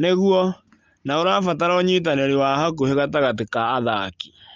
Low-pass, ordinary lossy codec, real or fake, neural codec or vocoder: 7.2 kHz; Opus, 24 kbps; real; none